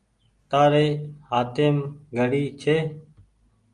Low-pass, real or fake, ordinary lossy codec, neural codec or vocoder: 10.8 kHz; real; Opus, 32 kbps; none